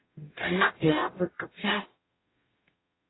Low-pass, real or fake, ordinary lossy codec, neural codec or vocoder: 7.2 kHz; fake; AAC, 16 kbps; codec, 44.1 kHz, 0.9 kbps, DAC